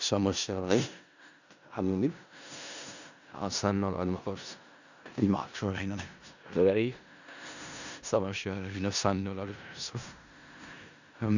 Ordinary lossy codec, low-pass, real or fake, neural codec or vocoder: none; 7.2 kHz; fake; codec, 16 kHz in and 24 kHz out, 0.4 kbps, LongCat-Audio-Codec, four codebook decoder